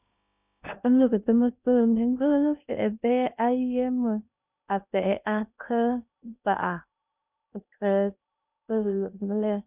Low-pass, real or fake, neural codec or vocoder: 3.6 kHz; fake; codec, 16 kHz in and 24 kHz out, 0.6 kbps, FocalCodec, streaming, 2048 codes